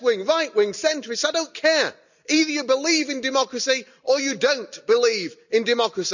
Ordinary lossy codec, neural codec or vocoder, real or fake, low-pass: none; none; real; 7.2 kHz